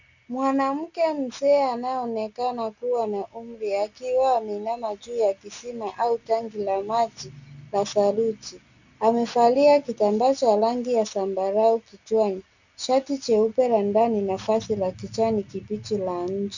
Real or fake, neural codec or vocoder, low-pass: real; none; 7.2 kHz